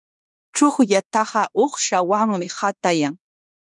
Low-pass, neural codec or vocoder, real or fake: 10.8 kHz; codec, 16 kHz in and 24 kHz out, 0.9 kbps, LongCat-Audio-Codec, fine tuned four codebook decoder; fake